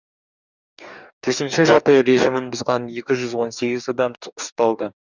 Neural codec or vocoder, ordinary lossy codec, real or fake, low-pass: codec, 44.1 kHz, 2.6 kbps, DAC; none; fake; 7.2 kHz